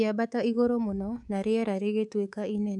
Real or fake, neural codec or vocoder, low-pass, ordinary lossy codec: fake; codec, 24 kHz, 3.1 kbps, DualCodec; none; none